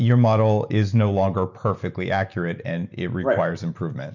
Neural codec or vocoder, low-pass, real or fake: none; 7.2 kHz; real